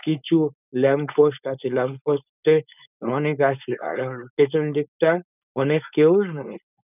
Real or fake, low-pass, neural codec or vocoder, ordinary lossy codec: fake; 3.6 kHz; codec, 16 kHz, 4.8 kbps, FACodec; none